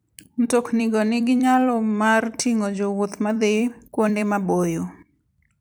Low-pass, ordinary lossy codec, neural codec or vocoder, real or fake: none; none; vocoder, 44.1 kHz, 128 mel bands every 256 samples, BigVGAN v2; fake